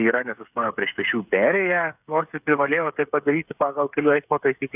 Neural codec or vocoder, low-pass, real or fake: codec, 16 kHz, 8 kbps, FreqCodec, smaller model; 3.6 kHz; fake